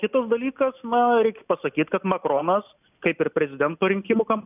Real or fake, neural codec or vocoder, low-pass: real; none; 3.6 kHz